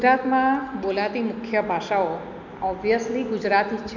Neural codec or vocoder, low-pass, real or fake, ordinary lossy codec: none; 7.2 kHz; real; none